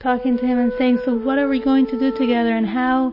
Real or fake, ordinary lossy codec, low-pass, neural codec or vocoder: real; MP3, 24 kbps; 5.4 kHz; none